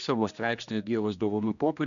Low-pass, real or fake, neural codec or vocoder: 7.2 kHz; fake; codec, 16 kHz, 1 kbps, X-Codec, HuBERT features, trained on general audio